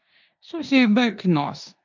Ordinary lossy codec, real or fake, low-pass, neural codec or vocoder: MP3, 64 kbps; fake; 7.2 kHz; codec, 16 kHz in and 24 kHz out, 0.9 kbps, LongCat-Audio-Codec, four codebook decoder